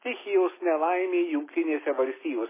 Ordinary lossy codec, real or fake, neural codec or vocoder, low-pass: MP3, 16 kbps; real; none; 3.6 kHz